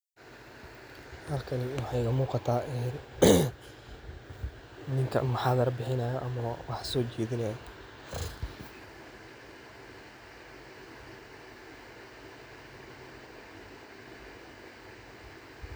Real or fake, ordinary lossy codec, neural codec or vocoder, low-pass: real; none; none; none